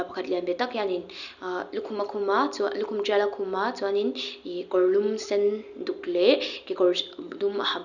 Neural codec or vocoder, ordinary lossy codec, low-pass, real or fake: none; none; 7.2 kHz; real